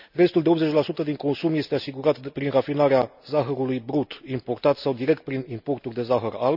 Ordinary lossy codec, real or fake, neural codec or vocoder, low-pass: none; real; none; 5.4 kHz